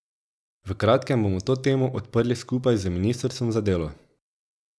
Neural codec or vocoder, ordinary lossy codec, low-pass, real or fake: none; none; none; real